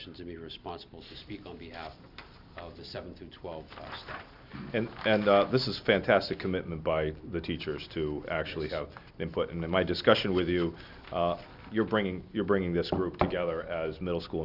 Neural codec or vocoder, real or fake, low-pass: none; real; 5.4 kHz